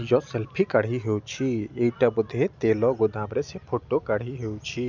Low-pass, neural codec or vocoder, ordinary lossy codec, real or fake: 7.2 kHz; none; none; real